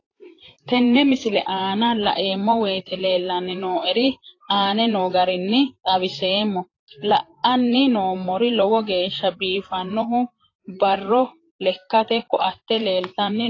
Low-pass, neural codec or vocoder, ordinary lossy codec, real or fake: 7.2 kHz; vocoder, 44.1 kHz, 128 mel bands, Pupu-Vocoder; AAC, 32 kbps; fake